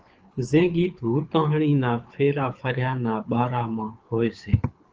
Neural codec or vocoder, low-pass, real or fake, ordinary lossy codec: codec, 24 kHz, 6 kbps, HILCodec; 7.2 kHz; fake; Opus, 24 kbps